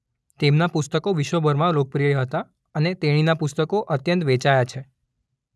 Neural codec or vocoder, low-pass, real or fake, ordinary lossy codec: none; none; real; none